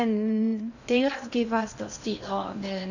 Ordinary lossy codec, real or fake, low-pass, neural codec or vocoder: none; fake; 7.2 kHz; codec, 16 kHz in and 24 kHz out, 0.6 kbps, FocalCodec, streaming, 2048 codes